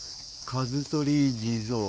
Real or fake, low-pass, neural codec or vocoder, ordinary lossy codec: fake; none; codec, 16 kHz, 4 kbps, X-Codec, WavLM features, trained on Multilingual LibriSpeech; none